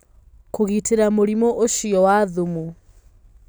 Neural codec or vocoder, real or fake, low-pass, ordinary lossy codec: none; real; none; none